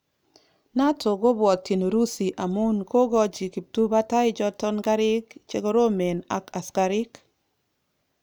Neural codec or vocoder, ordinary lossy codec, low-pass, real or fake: none; none; none; real